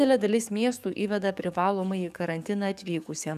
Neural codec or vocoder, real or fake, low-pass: codec, 44.1 kHz, 7.8 kbps, DAC; fake; 14.4 kHz